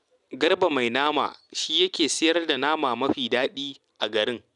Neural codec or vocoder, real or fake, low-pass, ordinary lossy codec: none; real; 10.8 kHz; none